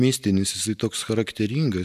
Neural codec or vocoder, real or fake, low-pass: none; real; 14.4 kHz